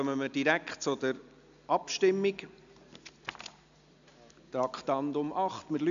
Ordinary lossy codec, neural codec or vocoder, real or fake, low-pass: AAC, 96 kbps; none; real; 7.2 kHz